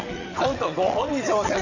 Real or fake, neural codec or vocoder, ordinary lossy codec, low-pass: fake; vocoder, 22.05 kHz, 80 mel bands, WaveNeXt; none; 7.2 kHz